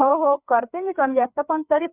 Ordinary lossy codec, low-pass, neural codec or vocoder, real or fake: none; 3.6 kHz; codec, 16 kHz, 4 kbps, FreqCodec, larger model; fake